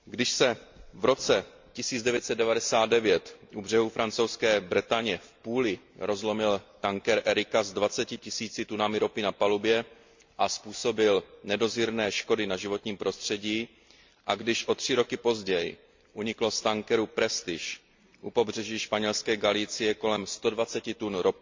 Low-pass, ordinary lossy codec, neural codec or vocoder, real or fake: 7.2 kHz; MP3, 48 kbps; none; real